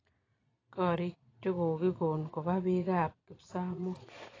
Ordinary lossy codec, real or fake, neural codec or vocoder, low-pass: AAC, 32 kbps; fake; vocoder, 22.05 kHz, 80 mel bands, Vocos; 7.2 kHz